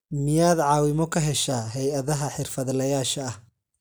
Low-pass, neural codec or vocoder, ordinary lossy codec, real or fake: none; none; none; real